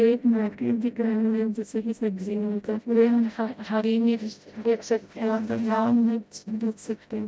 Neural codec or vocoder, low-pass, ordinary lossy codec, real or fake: codec, 16 kHz, 0.5 kbps, FreqCodec, smaller model; none; none; fake